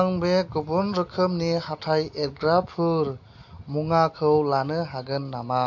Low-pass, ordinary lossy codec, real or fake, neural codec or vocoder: 7.2 kHz; AAC, 48 kbps; real; none